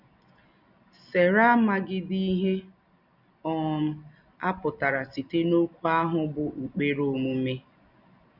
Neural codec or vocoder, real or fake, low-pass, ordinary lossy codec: none; real; 5.4 kHz; none